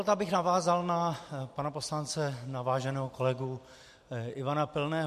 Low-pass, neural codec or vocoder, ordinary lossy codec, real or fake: 14.4 kHz; none; MP3, 64 kbps; real